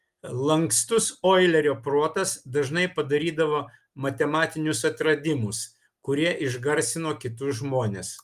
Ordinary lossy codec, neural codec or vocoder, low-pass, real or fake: Opus, 32 kbps; none; 14.4 kHz; real